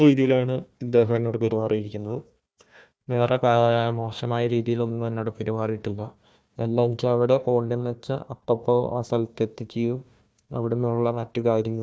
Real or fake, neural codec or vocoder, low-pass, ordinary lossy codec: fake; codec, 16 kHz, 1 kbps, FunCodec, trained on Chinese and English, 50 frames a second; none; none